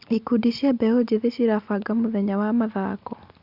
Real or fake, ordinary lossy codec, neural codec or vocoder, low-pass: real; Opus, 64 kbps; none; 5.4 kHz